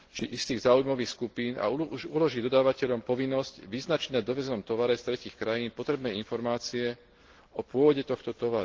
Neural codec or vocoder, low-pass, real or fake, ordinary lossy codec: none; 7.2 kHz; real; Opus, 16 kbps